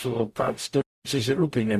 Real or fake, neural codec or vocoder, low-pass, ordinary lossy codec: fake; codec, 44.1 kHz, 0.9 kbps, DAC; 14.4 kHz; Opus, 64 kbps